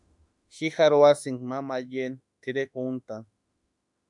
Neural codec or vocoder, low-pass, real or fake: autoencoder, 48 kHz, 32 numbers a frame, DAC-VAE, trained on Japanese speech; 10.8 kHz; fake